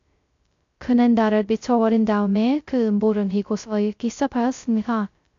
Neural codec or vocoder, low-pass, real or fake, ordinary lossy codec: codec, 16 kHz, 0.2 kbps, FocalCodec; 7.2 kHz; fake; AAC, 64 kbps